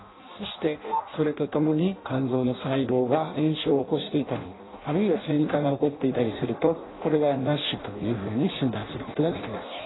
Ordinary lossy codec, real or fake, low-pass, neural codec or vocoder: AAC, 16 kbps; fake; 7.2 kHz; codec, 16 kHz in and 24 kHz out, 0.6 kbps, FireRedTTS-2 codec